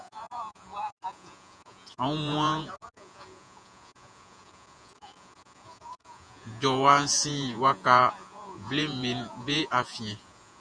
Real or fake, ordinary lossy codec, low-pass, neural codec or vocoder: fake; Opus, 64 kbps; 9.9 kHz; vocoder, 48 kHz, 128 mel bands, Vocos